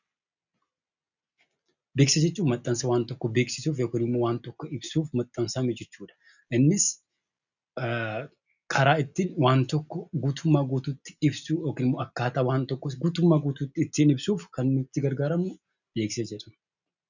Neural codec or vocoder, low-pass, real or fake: none; 7.2 kHz; real